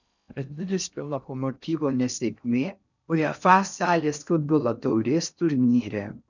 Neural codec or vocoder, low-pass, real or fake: codec, 16 kHz in and 24 kHz out, 0.6 kbps, FocalCodec, streaming, 4096 codes; 7.2 kHz; fake